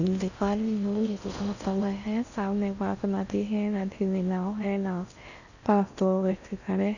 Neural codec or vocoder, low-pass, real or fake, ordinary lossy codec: codec, 16 kHz in and 24 kHz out, 0.6 kbps, FocalCodec, streaming, 4096 codes; 7.2 kHz; fake; none